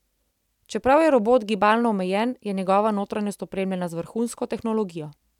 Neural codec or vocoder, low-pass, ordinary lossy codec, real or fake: none; 19.8 kHz; none; real